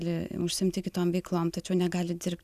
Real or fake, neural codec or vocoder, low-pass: real; none; 14.4 kHz